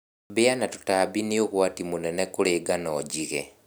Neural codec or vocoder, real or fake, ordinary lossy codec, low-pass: none; real; none; none